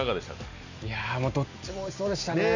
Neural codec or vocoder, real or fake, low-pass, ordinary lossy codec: none; real; 7.2 kHz; none